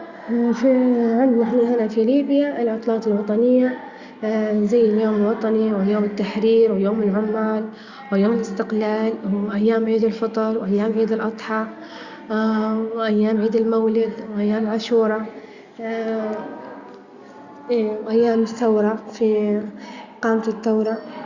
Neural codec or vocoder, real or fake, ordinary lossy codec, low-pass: none; real; Opus, 64 kbps; 7.2 kHz